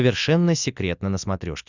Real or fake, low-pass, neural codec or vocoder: real; 7.2 kHz; none